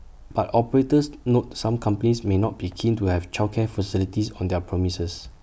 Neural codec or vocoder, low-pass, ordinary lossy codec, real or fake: none; none; none; real